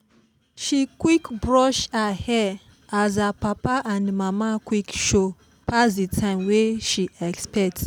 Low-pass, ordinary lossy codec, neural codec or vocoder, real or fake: none; none; none; real